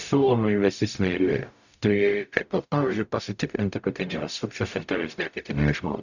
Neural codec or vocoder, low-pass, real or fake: codec, 44.1 kHz, 0.9 kbps, DAC; 7.2 kHz; fake